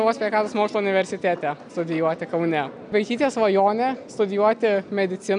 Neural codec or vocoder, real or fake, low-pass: none; real; 9.9 kHz